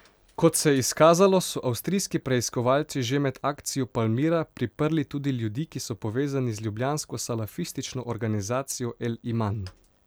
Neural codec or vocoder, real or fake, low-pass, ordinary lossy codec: none; real; none; none